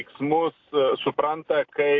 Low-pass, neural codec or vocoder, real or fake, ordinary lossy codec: 7.2 kHz; none; real; AAC, 48 kbps